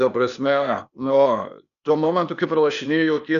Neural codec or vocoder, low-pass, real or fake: codec, 16 kHz, 0.8 kbps, ZipCodec; 7.2 kHz; fake